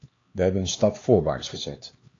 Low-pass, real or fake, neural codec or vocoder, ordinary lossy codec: 7.2 kHz; fake; codec, 16 kHz, 2 kbps, X-Codec, HuBERT features, trained on LibriSpeech; AAC, 32 kbps